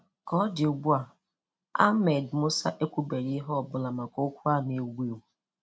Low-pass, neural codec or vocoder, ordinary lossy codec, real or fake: none; none; none; real